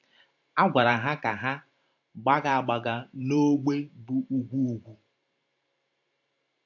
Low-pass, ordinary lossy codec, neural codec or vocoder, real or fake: 7.2 kHz; AAC, 48 kbps; vocoder, 44.1 kHz, 128 mel bands every 512 samples, BigVGAN v2; fake